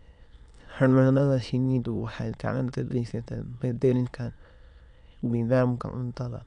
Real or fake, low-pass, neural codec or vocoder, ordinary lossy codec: fake; 9.9 kHz; autoencoder, 22.05 kHz, a latent of 192 numbers a frame, VITS, trained on many speakers; none